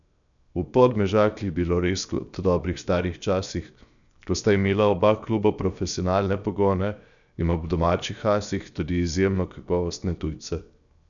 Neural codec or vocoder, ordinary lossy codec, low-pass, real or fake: codec, 16 kHz, 0.7 kbps, FocalCodec; none; 7.2 kHz; fake